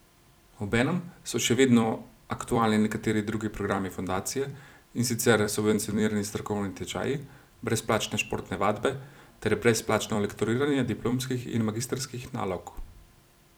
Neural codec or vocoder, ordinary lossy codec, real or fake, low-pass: vocoder, 44.1 kHz, 128 mel bands every 256 samples, BigVGAN v2; none; fake; none